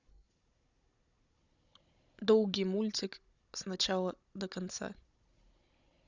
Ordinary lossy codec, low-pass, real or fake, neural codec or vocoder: Opus, 64 kbps; 7.2 kHz; fake; codec, 16 kHz, 16 kbps, FunCodec, trained on Chinese and English, 50 frames a second